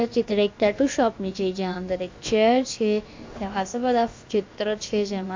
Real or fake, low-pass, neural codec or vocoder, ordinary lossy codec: fake; 7.2 kHz; codec, 16 kHz, about 1 kbps, DyCAST, with the encoder's durations; MP3, 48 kbps